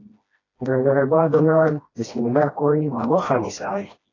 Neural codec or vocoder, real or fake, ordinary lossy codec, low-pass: codec, 16 kHz, 1 kbps, FreqCodec, smaller model; fake; AAC, 32 kbps; 7.2 kHz